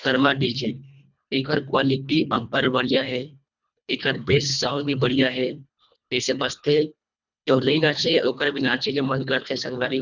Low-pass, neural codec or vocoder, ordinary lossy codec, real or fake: 7.2 kHz; codec, 24 kHz, 1.5 kbps, HILCodec; none; fake